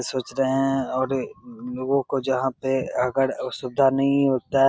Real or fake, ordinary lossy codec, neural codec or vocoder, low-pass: real; none; none; none